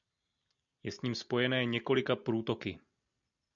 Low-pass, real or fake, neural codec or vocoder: 7.2 kHz; real; none